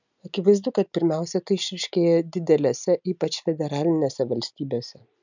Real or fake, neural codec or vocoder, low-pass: real; none; 7.2 kHz